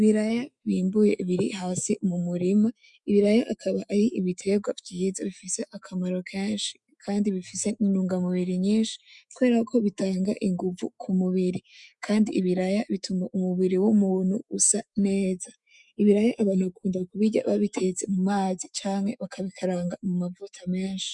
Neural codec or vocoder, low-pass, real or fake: autoencoder, 48 kHz, 128 numbers a frame, DAC-VAE, trained on Japanese speech; 10.8 kHz; fake